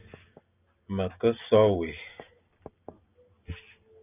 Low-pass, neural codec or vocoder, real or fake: 3.6 kHz; none; real